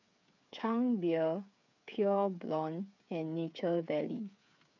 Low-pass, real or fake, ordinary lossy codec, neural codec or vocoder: 7.2 kHz; fake; none; codec, 16 kHz, 8 kbps, FreqCodec, smaller model